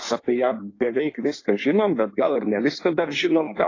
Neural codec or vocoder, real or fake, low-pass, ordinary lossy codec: codec, 16 kHz in and 24 kHz out, 1.1 kbps, FireRedTTS-2 codec; fake; 7.2 kHz; AAC, 32 kbps